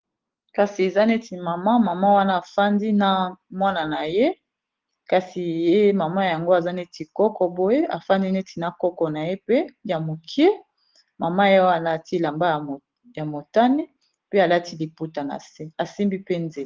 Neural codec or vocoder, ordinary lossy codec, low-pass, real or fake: none; Opus, 16 kbps; 7.2 kHz; real